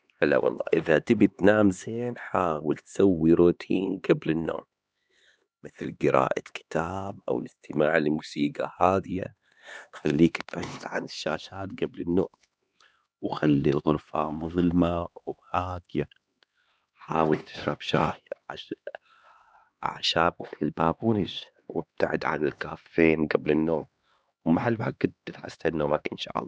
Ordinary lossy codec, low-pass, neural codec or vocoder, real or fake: none; none; codec, 16 kHz, 2 kbps, X-Codec, HuBERT features, trained on LibriSpeech; fake